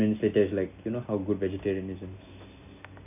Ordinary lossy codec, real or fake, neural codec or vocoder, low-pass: none; real; none; 3.6 kHz